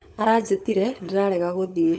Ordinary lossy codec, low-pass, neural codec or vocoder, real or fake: none; none; codec, 16 kHz, 16 kbps, FunCodec, trained on LibriTTS, 50 frames a second; fake